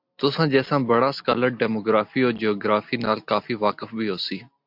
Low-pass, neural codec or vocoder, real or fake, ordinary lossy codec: 5.4 kHz; none; real; MP3, 48 kbps